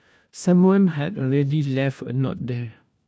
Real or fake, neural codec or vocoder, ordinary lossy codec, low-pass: fake; codec, 16 kHz, 1 kbps, FunCodec, trained on LibriTTS, 50 frames a second; none; none